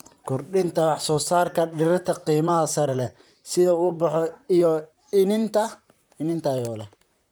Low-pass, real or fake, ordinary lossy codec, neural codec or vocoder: none; fake; none; vocoder, 44.1 kHz, 128 mel bands, Pupu-Vocoder